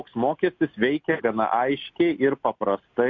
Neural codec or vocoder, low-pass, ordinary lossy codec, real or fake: none; 7.2 kHz; MP3, 48 kbps; real